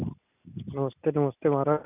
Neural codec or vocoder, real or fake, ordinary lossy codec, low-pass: none; real; none; 3.6 kHz